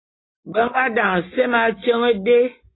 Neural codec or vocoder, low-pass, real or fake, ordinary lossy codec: none; 7.2 kHz; real; AAC, 16 kbps